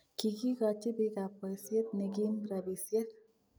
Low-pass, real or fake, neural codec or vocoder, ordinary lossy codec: none; real; none; none